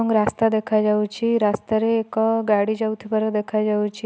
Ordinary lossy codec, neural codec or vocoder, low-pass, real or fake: none; none; none; real